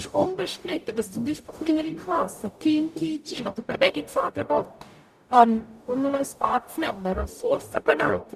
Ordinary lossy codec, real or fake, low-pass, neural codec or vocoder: none; fake; 14.4 kHz; codec, 44.1 kHz, 0.9 kbps, DAC